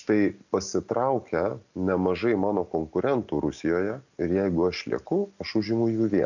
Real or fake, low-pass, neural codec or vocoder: real; 7.2 kHz; none